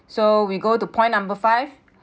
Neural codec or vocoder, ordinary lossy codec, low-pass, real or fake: none; none; none; real